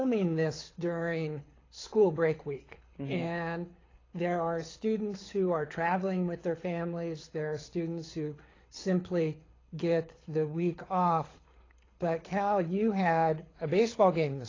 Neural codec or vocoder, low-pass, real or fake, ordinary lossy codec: codec, 24 kHz, 6 kbps, HILCodec; 7.2 kHz; fake; AAC, 32 kbps